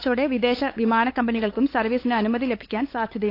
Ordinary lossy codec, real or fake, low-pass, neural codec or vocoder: AAC, 32 kbps; fake; 5.4 kHz; codec, 16 kHz, 8 kbps, FunCodec, trained on LibriTTS, 25 frames a second